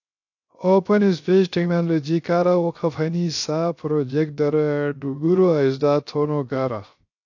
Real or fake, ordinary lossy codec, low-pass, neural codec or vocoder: fake; AAC, 48 kbps; 7.2 kHz; codec, 16 kHz, 0.3 kbps, FocalCodec